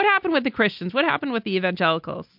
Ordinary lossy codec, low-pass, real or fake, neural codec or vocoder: MP3, 48 kbps; 5.4 kHz; real; none